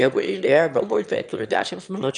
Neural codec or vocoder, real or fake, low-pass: autoencoder, 22.05 kHz, a latent of 192 numbers a frame, VITS, trained on one speaker; fake; 9.9 kHz